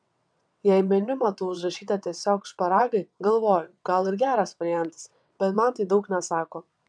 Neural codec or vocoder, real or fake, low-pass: vocoder, 22.05 kHz, 80 mel bands, WaveNeXt; fake; 9.9 kHz